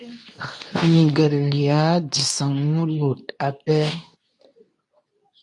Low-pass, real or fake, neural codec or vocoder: 10.8 kHz; fake; codec, 24 kHz, 0.9 kbps, WavTokenizer, medium speech release version 2